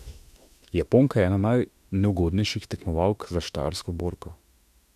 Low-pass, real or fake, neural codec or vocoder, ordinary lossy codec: 14.4 kHz; fake; autoencoder, 48 kHz, 32 numbers a frame, DAC-VAE, trained on Japanese speech; none